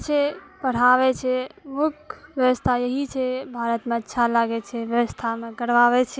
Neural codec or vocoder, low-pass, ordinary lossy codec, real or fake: none; none; none; real